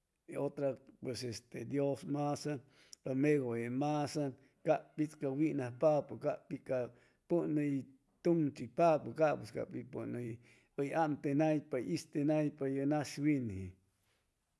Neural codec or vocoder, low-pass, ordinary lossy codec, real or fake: none; none; none; real